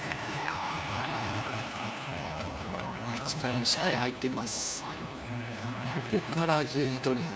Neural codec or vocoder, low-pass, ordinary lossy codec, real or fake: codec, 16 kHz, 1 kbps, FunCodec, trained on LibriTTS, 50 frames a second; none; none; fake